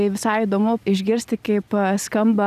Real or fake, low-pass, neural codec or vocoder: real; 14.4 kHz; none